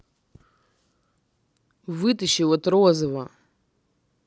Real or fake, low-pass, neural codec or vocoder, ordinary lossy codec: real; none; none; none